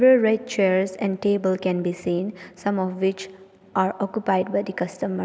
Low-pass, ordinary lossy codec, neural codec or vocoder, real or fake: none; none; none; real